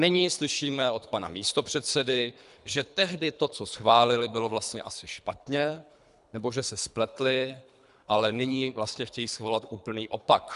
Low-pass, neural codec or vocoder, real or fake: 10.8 kHz; codec, 24 kHz, 3 kbps, HILCodec; fake